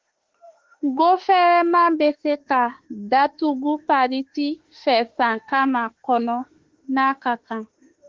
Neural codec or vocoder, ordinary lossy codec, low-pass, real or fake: autoencoder, 48 kHz, 32 numbers a frame, DAC-VAE, trained on Japanese speech; Opus, 16 kbps; 7.2 kHz; fake